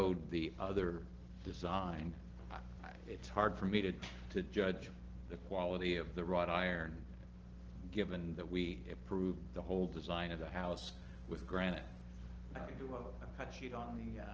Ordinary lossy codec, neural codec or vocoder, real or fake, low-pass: Opus, 16 kbps; none; real; 7.2 kHz